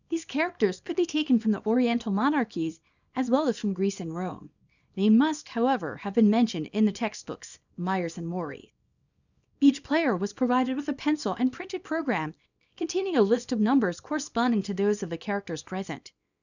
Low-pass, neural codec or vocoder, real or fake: 7.2 kHz; codec, 24 kHz, 0.9 kbps, WavTokenizer, small release; fake